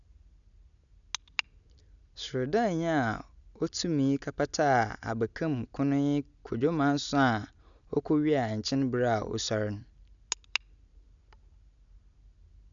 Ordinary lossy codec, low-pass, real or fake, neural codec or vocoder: none; 7.2 kHz; real; none